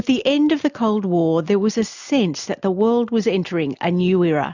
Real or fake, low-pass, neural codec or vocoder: real; 7.2 kHz; none